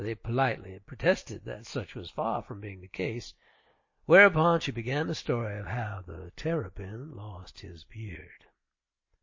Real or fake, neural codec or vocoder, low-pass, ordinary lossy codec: real; none; 7.2 kHz; MP3, 32 kbps